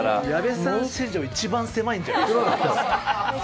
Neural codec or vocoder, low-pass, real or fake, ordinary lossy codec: none; none; real; none